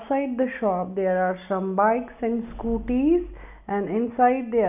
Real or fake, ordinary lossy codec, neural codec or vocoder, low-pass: real; none; none; 3.6 kHz